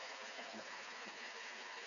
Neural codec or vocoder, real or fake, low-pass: codec, 16 kHz, 4 kbps, FreqCodec, smaller model; fake; 7.2 kHz